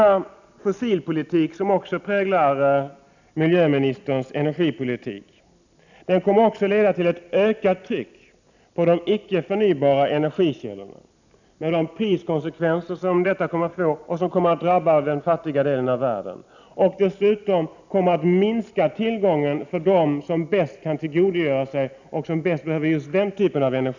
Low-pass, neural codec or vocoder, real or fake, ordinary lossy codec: 7.2 kHz; none; real; none